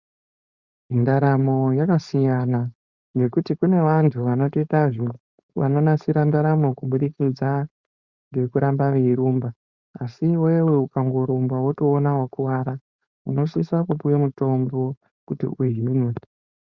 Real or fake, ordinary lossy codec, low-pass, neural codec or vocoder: fake; Opus, 64 kbps; 7.2 kHz; codec, 16 kHz, 4.8 kbps, FACodec